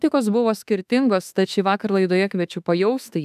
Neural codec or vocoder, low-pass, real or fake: autoencoder, 48 kHz, 32 numbers a frame, DAC-VAE, trained on Japanese speech; 14.4 kHz; fake